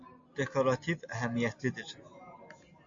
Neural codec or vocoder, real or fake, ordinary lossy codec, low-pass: none; real; AAC, 64 kbps; 7.2 kHz